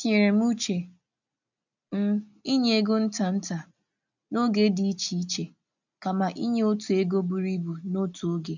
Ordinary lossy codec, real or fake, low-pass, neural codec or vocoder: none; real; 7.2 kHz; none